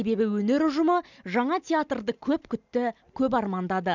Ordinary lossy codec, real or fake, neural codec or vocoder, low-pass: none; real; none; 7.2 kHz